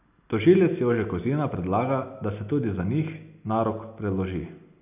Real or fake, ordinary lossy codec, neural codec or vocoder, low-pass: real; none; none; 3.6 kHz